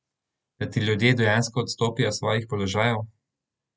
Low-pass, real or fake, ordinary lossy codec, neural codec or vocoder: none; real; none; none